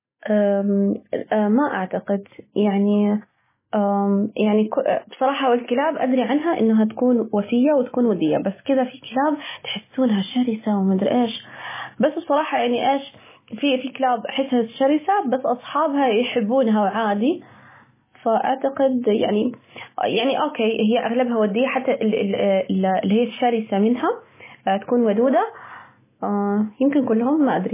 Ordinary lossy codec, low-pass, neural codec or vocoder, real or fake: MP3, 16 kbps; 3.6 kHz; none; real